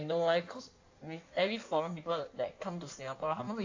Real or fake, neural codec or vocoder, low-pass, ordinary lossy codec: fake; codec, 16 kHz in and 24 kHz out, 1.1 kbps, FireRedTTS-2 codec; 7.2 kHz; AAC, 48 kbps